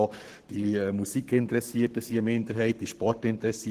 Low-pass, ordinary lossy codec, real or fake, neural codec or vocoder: 14.4 kHz; Opus, 16 kbps; fake; codec, 44.1 kHz, 7.8 kbps, Pupu-Codec